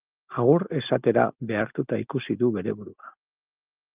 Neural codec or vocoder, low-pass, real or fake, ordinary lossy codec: none; 3.6 kHz; real; Opus, 24 kbps